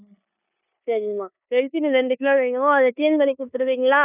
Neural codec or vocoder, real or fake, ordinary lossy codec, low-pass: codec, 16 kHz in and 24 kHz out, 0.9 kbps, LongCat-Audio-Codec, four codebook decoder; fake; none; 3.6 kHz